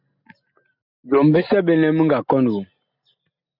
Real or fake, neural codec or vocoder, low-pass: real; none; 5.4 kHz